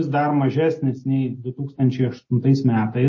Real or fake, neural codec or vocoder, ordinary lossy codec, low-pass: real; none; MP3, 32 kbps; 7.2 kHz